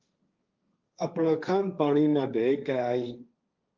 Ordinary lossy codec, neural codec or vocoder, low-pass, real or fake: Opus, 24 kbps; codec, 16 kHz, 1.1 kbps, Voila-Tokenizer; 7.2 kHz; fake